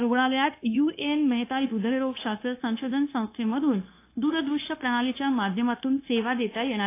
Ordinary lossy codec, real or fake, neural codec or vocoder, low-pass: AAC, 24 kbps; fake; codec, 16 kHz, 0.9 kbps, LongCat-Audio-Codec; 3.6 kHz